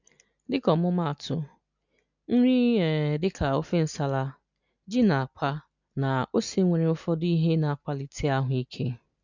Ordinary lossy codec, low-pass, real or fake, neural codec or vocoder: none; 7.2 kHz; real; none